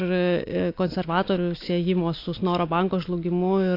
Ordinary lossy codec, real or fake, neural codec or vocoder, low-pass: AAC, 32 kbps; real; none; 5.4 kHz